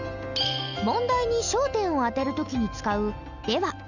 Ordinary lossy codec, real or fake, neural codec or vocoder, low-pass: none; real; none; 7.2 kHz